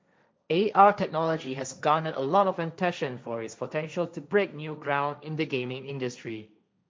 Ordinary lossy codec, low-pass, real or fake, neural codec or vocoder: none; none; fake; codec, 16 kHz, 1.1 kbps, Voila-Tokenizer